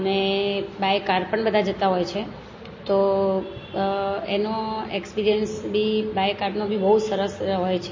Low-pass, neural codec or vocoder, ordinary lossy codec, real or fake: 7.2 kHz; none; MP3, 32 kbps; real